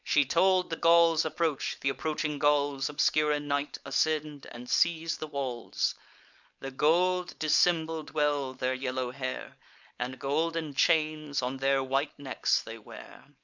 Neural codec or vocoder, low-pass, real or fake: codec, 16 kHz, 4.8 kbps, FACodec; 7.2 kHz; fake